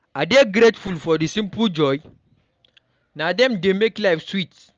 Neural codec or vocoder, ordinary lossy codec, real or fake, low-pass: none; Opus, 24 kbps; real; 7.2 kHz